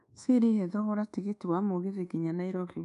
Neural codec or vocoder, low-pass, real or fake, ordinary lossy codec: codec, 24 kHz, 1.2 kbps, DualCodec; 10.8 kHz; fake; none